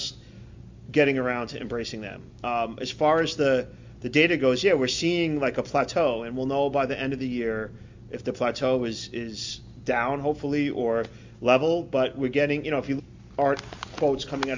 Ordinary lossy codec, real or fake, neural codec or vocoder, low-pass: MP3, 64 kbps; real; none; 7.2 kHz